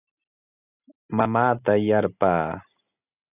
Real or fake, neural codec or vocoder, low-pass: real; none; 3.6 kHz